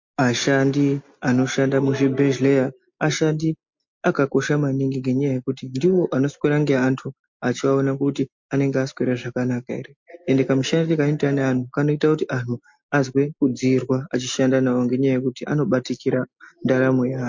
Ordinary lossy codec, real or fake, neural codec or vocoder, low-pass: MP3, 48 kbps; real; none; 7.2 kHz